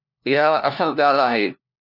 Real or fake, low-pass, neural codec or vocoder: fake; 5.4 kHz; codec, 16 kHz, 1 kbps, FunCodec, trained on LibriTTS, 50 frames a second